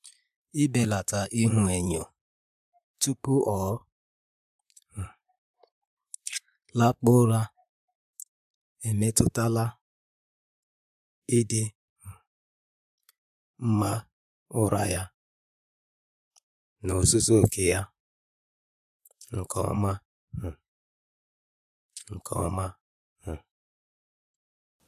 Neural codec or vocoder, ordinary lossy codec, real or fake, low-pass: vocoder, 44.1 kHz, 128 mel bands, Pupu-Vocoder; MP3, 96 kbps; fake; 14.4 kHz